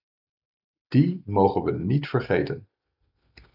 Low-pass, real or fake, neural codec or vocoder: 5.4 kHz; real; none